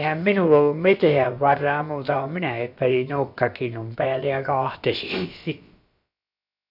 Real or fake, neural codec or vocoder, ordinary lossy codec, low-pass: fake; codec, 16 kHz, about 1 kbps, DyCAST, with the encoder's durations; none; 5.4 kHz